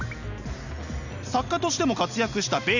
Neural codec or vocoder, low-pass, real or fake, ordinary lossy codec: none; 7.2 kHz; real; none